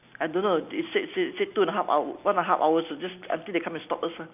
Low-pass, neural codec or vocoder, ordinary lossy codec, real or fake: 3.6 kHz; none; none; real